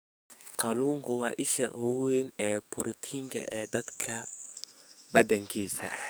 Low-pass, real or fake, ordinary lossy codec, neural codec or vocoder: none; fake; none; codec, 44.1 kHz, 2.6 kbps, SNAC